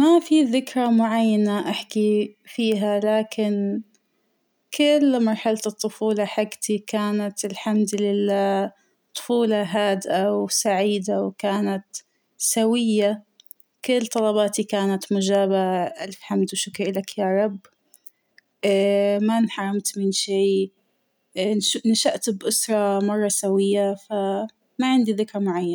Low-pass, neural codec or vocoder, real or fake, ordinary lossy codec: none; none; real; none